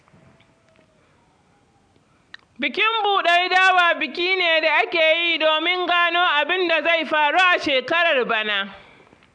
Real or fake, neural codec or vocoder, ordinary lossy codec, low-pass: real; none; AAC, 64 kbps; 9.9 kHz